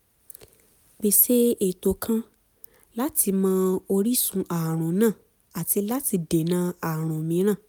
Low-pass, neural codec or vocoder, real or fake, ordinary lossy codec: none; none; real; none